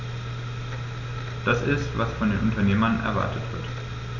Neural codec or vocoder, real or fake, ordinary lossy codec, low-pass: none; real; none; 7.2 kHz